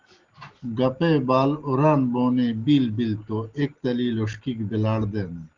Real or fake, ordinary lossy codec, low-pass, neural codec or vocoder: real; Opus, 16 kbps; 7.2 kHz; none